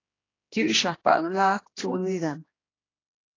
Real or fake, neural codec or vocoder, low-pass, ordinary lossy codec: fake; codec, 16 kHz, 1 kbps, X-Codec, HuBERT features, trained on general audio; 7.2 kHz; AAC, 32 kbps